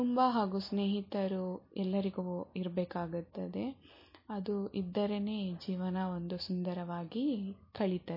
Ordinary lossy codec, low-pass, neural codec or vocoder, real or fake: MP3, 24 kbps; 5.4 kHz; none; real